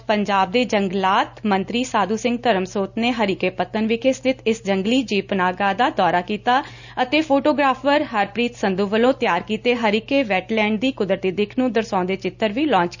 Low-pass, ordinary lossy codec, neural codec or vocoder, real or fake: 7.2 kHz; none; none; real